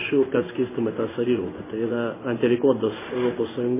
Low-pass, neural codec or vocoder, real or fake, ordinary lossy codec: 3.6 kHz; codec, 16 kHz in and 24 kHz out, 1 kbps, XY-Tokenizer; fake; MP3, 16 kbps